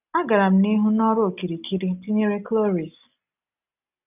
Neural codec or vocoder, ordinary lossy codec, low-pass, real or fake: none; none; 3.6 kHz; real